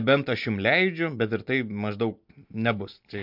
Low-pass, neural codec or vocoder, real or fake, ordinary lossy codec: 5.4 kHz; none; real; MP3, 48 kbps